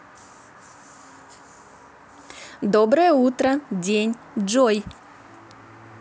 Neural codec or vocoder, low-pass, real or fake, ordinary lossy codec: none; none; real; none